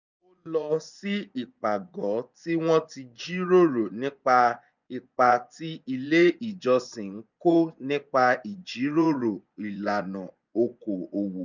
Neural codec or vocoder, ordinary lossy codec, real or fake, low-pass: vocoder, 24 kHz, 100 mel bands, Vocos; none; fake; 7.2 kHz